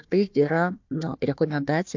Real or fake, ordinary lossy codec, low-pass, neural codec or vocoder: fake; MP3, 64 kbps; 7.2 kHz; codec, 16 kHz, 1 kbps, FunCodec, trained on Chinese and English, 50 frames a second